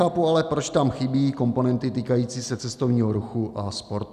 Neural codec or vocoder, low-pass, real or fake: none; 14.4 kHz; real